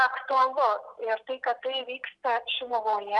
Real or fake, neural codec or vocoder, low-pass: real; none; 10.8 kHz